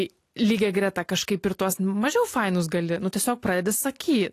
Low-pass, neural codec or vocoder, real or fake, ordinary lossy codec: 14.4 kHz; none; real; AAC, 48 kbps